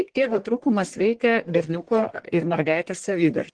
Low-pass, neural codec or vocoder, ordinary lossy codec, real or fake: 9.9 kHz; codec, 44.1 kHz, 1.7 kbps, Pupu-Codec; Opus, 16 kbps; fake